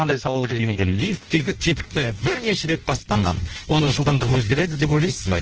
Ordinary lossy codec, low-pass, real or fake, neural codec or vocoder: Opus, 16 kbps; 7.2 kHz; fake; codec, 16 kHz in and 24 kHz out, 0.6 kbps, FireRedTTS-2 codec